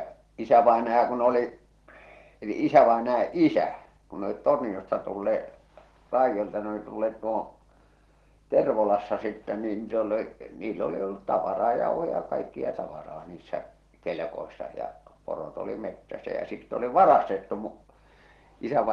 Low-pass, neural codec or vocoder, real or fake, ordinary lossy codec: 10.8 kHz; none; real; Opus, 16 kbps